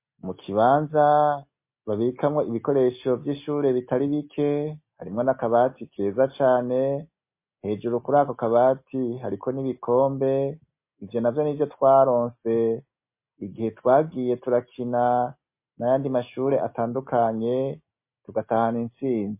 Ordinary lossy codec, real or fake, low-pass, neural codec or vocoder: MP3, 24 kbps; real; 3.6 kHz; none